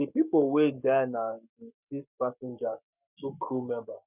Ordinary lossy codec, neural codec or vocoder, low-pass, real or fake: none; codec, 44.1 kHz, 7.8 kbps, Pupu-Codec; 3.6 kHz; fake